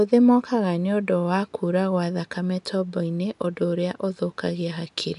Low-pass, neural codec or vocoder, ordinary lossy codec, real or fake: 10.8 kHz; none; none; real